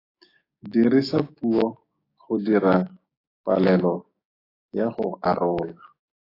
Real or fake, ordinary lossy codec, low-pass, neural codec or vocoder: fake; AAC, 24 kbps; 5.4 kHz; codec, 16 kHz, 6 kbps, DAC